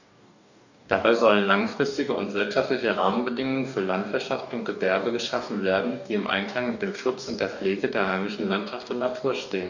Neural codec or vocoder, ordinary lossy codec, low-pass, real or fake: codec, 44.1 kHz, 2.6 kbps, DAC; none; 7.2 kHz; fake